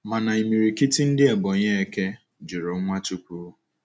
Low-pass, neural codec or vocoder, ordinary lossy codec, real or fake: none; none; none; real